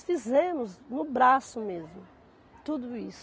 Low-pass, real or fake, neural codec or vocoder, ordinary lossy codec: none; real; none; none